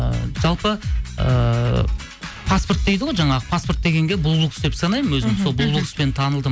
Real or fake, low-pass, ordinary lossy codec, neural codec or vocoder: real; none; none; none